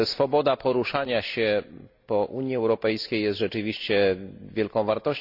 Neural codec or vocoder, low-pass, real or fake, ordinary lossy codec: none; 5.4 kHz; real; none